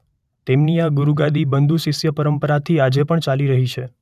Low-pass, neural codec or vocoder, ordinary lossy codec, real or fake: 14.4 kHz; vocoder, 44.1 kHz, 128 mel bands every 512 samples, BigVGAN v2; none; fake